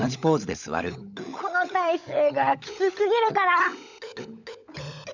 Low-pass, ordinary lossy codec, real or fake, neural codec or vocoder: 7.2 kHz; none; fake; codec, 16 kHz, 16 kbps, FunCodec, trained on LibriTTS, 50 frames a second